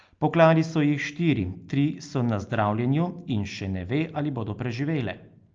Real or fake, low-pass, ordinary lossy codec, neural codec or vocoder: real; 7.2 kHz; Opus, 24 kbps; none